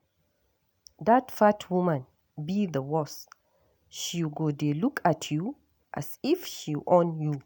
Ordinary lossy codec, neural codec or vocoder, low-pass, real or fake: none; none; none; real